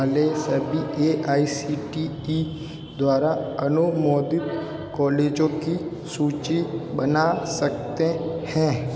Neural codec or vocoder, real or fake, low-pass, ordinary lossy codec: none; real; none; none